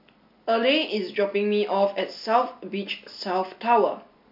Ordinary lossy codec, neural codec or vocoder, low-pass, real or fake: MP3, 48 kbps; none; 5.4 kHz; real